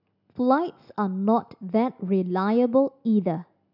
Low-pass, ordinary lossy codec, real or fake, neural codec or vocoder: 5.4 kHz; none; real; none